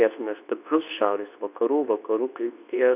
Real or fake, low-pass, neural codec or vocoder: fake; 3.6 kHz; codec, 24 kHz, 0.9 kbps, WavTokenizer, medium speech release version 2